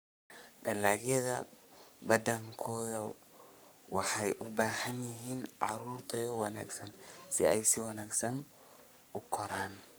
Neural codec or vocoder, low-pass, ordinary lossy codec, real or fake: codec, 44.1 kHz, 3.4 kbps, Pupu-Codec; none; none; fake